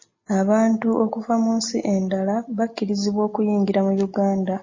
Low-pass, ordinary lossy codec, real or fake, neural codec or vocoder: 7.2 kHz; MP3, 32 kbps; real; none